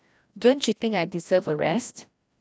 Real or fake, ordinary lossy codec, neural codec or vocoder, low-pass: fake; none; codec, 16 kHz, 1 kbps, FreqCodec, larger model; none